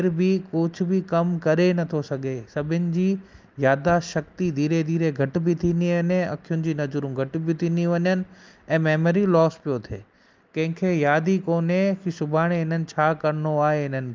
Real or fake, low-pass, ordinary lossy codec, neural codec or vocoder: real; 7.2 kHz; Opus, 32 kbps; none